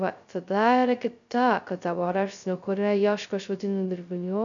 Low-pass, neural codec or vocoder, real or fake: 7.2 kHz; codec, 16 kHz, 0.2 kbps, FocalCodec; fake